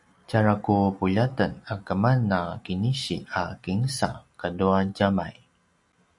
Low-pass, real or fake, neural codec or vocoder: 10.8 kHz; real; none